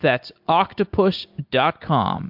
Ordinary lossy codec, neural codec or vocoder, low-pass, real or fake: MP3, 48 kbps; none; 5.4 kHz; real